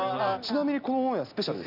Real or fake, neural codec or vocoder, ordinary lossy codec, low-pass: real; none; none; 5.4 kHz